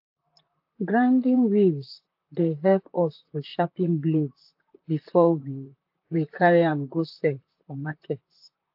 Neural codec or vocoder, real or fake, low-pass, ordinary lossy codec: vocoder, 44.1 kHz, 128 mel bands, Pupu-Vocoder; fake; 5.4 kHz; AAC, 48 kbps